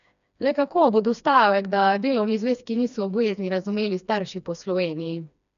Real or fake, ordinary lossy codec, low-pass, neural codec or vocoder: fake; none; 7.2 kHz; codec, 16 kHz, 2 kbps, FreqCodec, smaller model